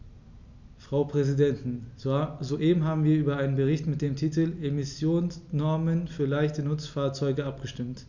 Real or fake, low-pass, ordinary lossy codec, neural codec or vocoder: real; 7.2 kHz; none; none